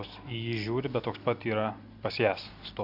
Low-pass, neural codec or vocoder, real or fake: 5.4 kHz; none; real